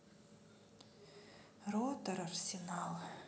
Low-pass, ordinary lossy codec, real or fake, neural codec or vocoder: none; none; real; none